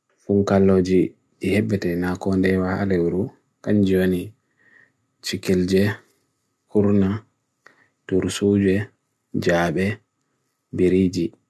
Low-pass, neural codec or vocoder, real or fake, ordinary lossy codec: none; none; real; none